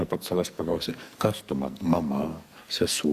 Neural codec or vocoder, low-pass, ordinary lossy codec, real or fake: codec, 44.1 kHz, 2.6 kbps, SNAC; 14.4 kHz; Opus, 64 kbps; fake